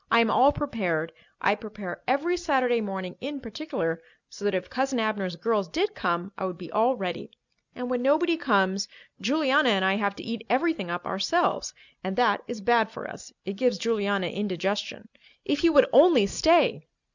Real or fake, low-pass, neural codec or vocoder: real; 7.2 kHz; none